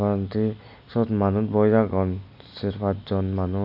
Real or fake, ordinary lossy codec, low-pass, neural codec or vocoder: real; none; 5.4 kHz; none